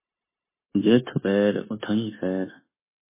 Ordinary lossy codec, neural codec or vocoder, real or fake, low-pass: MP3, 16 kbps; codec, 16 kHz, 0.9 kbps, LongCat-Audio-Codec; fake; 3.6 kHz